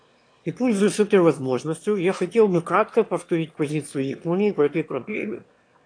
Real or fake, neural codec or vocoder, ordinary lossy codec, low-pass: fake; autoencoder, 22.05 kHz, a latent of 192 numbers a frame, VITS, trained on one speaker; AAC, 48 kbps; 9.9 kHz